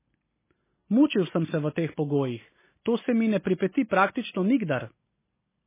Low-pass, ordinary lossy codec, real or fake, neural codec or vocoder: 3.6 kHz; MP3, 16 kbps; real; none